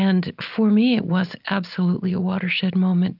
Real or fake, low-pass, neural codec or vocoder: real; 5.4 kHz; none